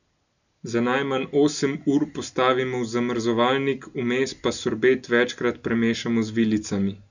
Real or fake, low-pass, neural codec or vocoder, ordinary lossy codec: real; 7.2 kHz; none; none